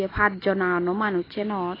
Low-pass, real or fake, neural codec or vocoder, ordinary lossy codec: 5.4 kHz; real; none; AAC, 24 kbps